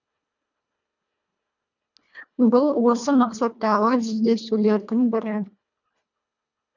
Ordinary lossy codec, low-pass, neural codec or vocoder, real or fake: none; 7.2 kHz; codec, 24 kHz, 1.5 kbps, HILCodec; fake